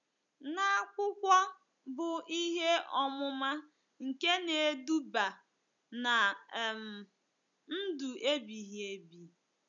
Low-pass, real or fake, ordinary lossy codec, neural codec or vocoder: 7.2 kHz; real; none; none